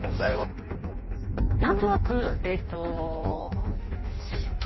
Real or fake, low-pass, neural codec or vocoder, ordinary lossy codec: fake; 7.2 kHz; codec, 16 kHz in and 24 kHz out, 0.6 kbps, FireRedTTS-2 codec; MP3, 24 kbps